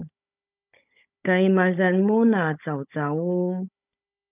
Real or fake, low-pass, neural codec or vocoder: fake; 3.6 kHz; codec, 16 kHz, 16 kbps, FunCodec, trained on Chinese and English, 50 frames a second